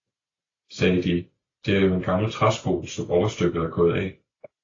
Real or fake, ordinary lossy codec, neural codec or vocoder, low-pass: real; AAC, 32 kbps; none; 7.2 kHz